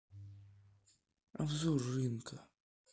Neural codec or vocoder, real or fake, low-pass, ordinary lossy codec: none; real; none; none